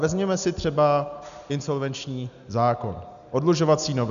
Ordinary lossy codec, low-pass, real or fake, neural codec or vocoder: MP3, 96 kbps; 7.2 kHz; real; none